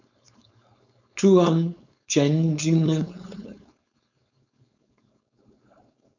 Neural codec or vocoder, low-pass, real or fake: codec, 16 kHz, 4.8 kbps, FACodec; 7.2 kHz; fake